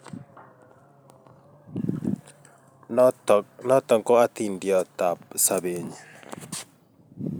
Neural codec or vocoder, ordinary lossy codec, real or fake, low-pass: vocoder, 44.1 kHz, 128 mel bands every 256 samples, BigVGAN v2; none; fake; none